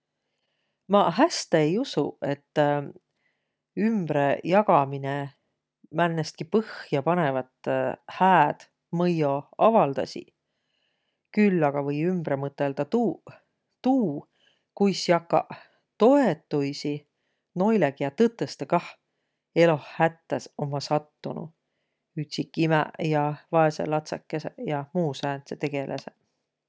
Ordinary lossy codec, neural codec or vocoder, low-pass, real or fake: none; none; none; real